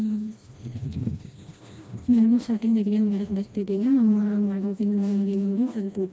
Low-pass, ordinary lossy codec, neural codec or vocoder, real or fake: none; none; codec, 16 kHz, 1 kbps, FreqCodec, smaller model; fake